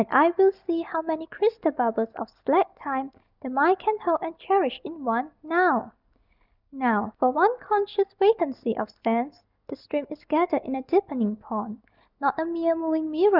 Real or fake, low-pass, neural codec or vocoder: real; 5.4 kHz; none